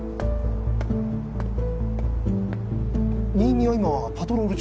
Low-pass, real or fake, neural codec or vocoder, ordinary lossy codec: none; real; none; none